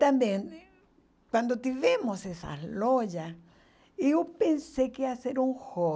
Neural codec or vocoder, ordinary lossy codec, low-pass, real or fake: none; none; none; real